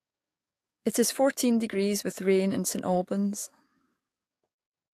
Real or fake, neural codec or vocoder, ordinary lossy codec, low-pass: fake; codec, 44.1 kHz, 7.8 kbps, DAC; AAC, 64 kbps; 14.4 kHz